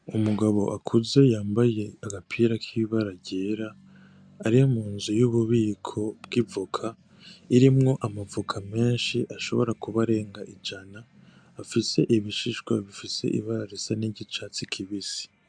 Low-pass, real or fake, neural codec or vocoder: 9.9 kHz; real; none